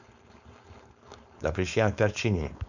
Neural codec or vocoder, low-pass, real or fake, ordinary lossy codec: codec, 16 kHz, 4.8 kbps, FACodec; 7.2 kHz; fake; none